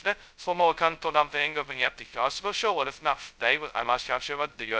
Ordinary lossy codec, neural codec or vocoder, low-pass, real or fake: none; codec, 16 kHz, 0.2 kbps, FocalCodec; none; fake